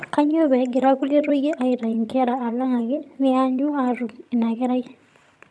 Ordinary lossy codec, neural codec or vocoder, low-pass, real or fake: none; vocoder, 22.05 kHz, 80 mel bands, HiFi-GAN; none; fake